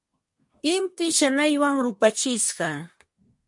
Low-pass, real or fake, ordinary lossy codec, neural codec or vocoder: 10.8 kHz; fake; MP3, 48 kbps; codec, 24 kHz, 1 kbps, SNAC